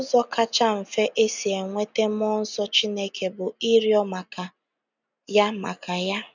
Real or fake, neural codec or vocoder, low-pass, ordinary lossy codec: real; none; 7.2 kHz; none